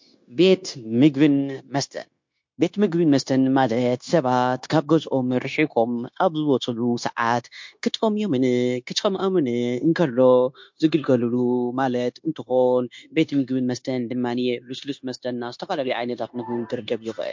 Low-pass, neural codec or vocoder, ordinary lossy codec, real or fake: 7.2 kHz; codec, 16 kHz, 0.9 kbps, LongCat-Audio-Codec; MP3, 48 kbps; fake